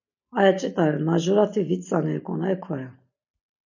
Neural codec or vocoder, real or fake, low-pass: none; real; 7.2 kHz